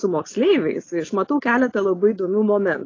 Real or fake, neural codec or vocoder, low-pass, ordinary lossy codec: real; none; 7.2 kHz; AAC, 32 kbps